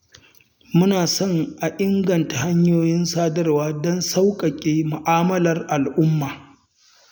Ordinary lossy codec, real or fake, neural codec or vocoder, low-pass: none; real; none; 19.8 kHz